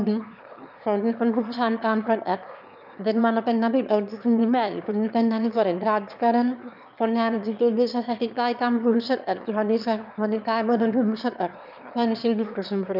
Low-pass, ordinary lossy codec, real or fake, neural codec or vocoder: 5.4 kHz; none; fake; autoencoder, 22.05 kHz, a latent of 192 numbers a frame, VITS, trained on one speaker